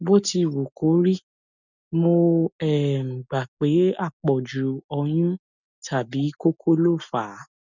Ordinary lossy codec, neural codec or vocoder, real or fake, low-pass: none; none; real; 7.2 kHz